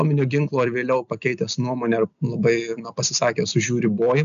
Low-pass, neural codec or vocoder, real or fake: 7.2 kHz; none; real